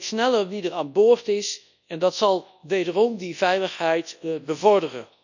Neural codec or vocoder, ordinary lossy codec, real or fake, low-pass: codec, 24 kHz, 0.9 kbps, WavTokenizer, large speech release; none; fake; 7.2 kHz